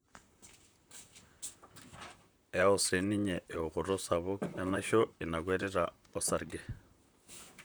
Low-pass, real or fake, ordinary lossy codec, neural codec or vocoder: none; fake; none; vocoder, 44.1 kHz, 128 mel bands, Pupu-Vocoder